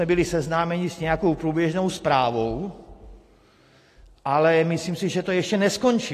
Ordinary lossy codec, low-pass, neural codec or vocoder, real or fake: AAC, 48 kbps; 14.4 kHz; none; real